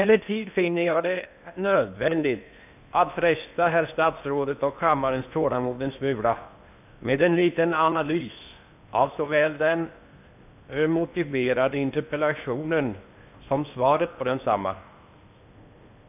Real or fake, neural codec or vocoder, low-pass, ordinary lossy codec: fake; codec, 16 kHz in and 24 kHz out, 0.8 kbps, FocalCodec, streaming, 65536 codes; 3.6 kHz; none